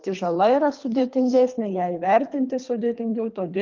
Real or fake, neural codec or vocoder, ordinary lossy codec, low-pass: fake; codec, 24 kHz, 3 kbps, HILCodec; Opus, 32 kbps; 7.2 kHz